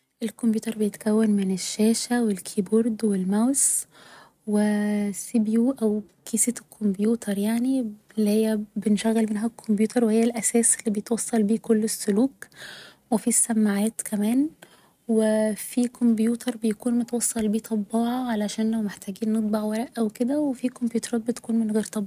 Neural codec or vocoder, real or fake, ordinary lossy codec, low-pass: none; real; none; 14.4 kHz